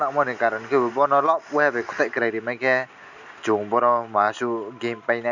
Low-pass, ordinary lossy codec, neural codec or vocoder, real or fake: 7.2 kHz; none; none; real